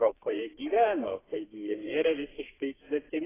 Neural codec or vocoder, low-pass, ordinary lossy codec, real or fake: codec, 32 kHz, 1.9 kbps, SNAC; 3.6 kHz; AAC, 16 kbps; fake